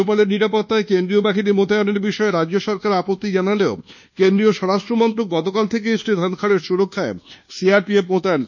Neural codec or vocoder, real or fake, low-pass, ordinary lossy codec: codec, 24 kHz, 1.2 kbps, DualCodec; fake; 7.2 kHz; none